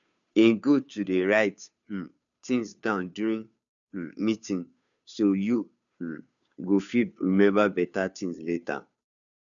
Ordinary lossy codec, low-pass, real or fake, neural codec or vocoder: none; 7.2 kHz; fake; codec, 16 kHz, 2 kbps, FunCodec, trained on Chinese and English, 25 frames a second